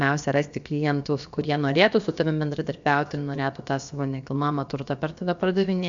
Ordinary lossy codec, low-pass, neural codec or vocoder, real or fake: MP3, 64 kbps; 7.2 kHz; codec, 16 kHz, about 1 kbps, DyCAST, with the encoder's durations; fake